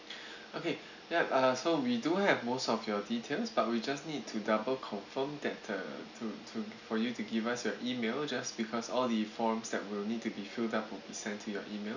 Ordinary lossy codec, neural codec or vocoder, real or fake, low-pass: none; none; real; 7.2 kHz